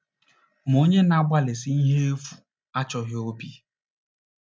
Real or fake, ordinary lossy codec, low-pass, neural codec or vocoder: real; none; none; none